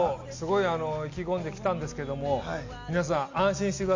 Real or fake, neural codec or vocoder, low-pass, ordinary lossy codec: real; none; 7.2 kHz; MP3, 64 kbps